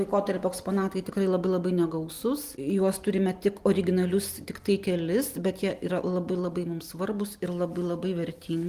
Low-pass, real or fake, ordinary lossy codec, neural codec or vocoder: 14.4 kHz; real; Opus, 24 kbps; none